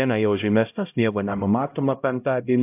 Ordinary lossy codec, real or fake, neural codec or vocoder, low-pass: AAC, 24 kbps; fake; codec, 16 kHz, 0.5 kbps, X-Codec, HuBERT features, trained on LibriSpeech; 3.6 kHz